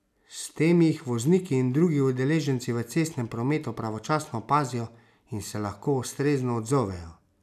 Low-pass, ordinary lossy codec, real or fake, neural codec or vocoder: 14.4 kHz; none; real; none